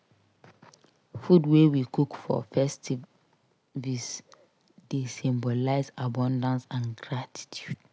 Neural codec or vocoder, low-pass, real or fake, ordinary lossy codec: none; none; real; none